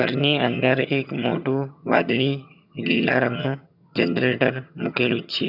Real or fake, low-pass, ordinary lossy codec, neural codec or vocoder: fake; 5.4 kHz; none; vocoder, 22.05 kHz, 80 mel bands, HiFi-GAN